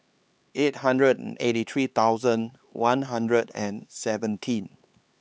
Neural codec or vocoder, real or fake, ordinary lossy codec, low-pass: codec, 16 kHz, 4 kbps, X-Codec, HuBERT features, trained on LibriSpeech; fake; none; none